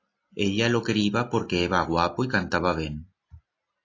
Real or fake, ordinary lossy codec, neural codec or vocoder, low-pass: real; AAC, 32 kbps; none; 7.2 kHz